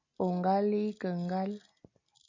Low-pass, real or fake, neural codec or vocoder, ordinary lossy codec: 7.2 kHz; real; none; MP3, 32 kbps